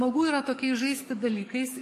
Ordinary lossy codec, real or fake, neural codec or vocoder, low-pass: MP3, 64 kbps; fake; codec, 44.1 kHz, 7.8 kbps, DAC; 14.4 kHz